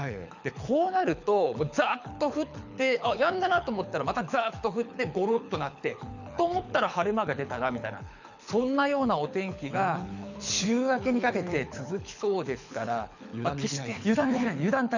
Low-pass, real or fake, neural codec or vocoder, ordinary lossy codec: 7.2 kHz; fake; codec, 24 kHz, 6 kbps, HILCodec; none